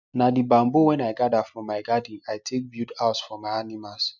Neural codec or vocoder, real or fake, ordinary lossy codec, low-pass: none; real; none; 7.2 kHz